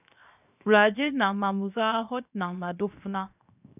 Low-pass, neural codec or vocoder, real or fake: 3.6 kHz; codec, 16 kHz, 0.7 kbps, FocalCodec; fake